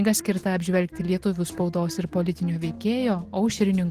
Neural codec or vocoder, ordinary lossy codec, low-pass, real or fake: none; Opus, 16 kbps; 14.4 kHz; real